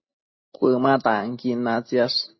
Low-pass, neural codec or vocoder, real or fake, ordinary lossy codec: 7.2 kHz; none; real; MP3, 24 kbps